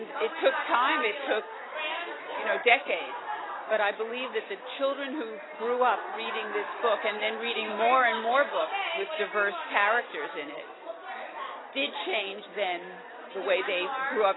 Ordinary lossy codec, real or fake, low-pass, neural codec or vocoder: AAC, 16 kbps; real; 7.2 kHz; none